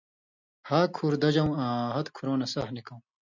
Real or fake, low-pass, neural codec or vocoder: real; 7.2 kHz; none